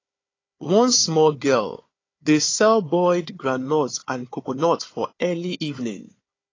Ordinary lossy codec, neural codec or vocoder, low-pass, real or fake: AAC, 32 kbps; codec, 16 kHz, 4 kbps, FunCodec, trained on Chinese and English, 50 frames a second; 7.2 kHz; fake